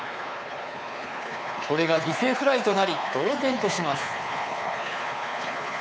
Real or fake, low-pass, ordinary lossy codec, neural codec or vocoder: fake; none; none; codec, 16 kHz, 4 kbps, X-Codec, WavLM features, trained on Multilingual LibriSpeech